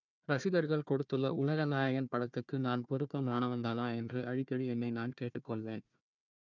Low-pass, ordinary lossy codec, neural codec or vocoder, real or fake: 7.2 kHz; none; codec, 16 kHz, 1 kbps, FunCodec, trained on Chinese and English, 50 frames a second; fake